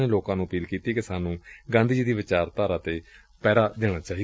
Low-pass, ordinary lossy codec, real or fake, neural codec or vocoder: none; none; real; none